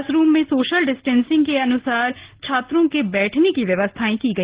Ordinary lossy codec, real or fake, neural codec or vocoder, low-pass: Opus, 16 kbps; real; none; 3.6 kHz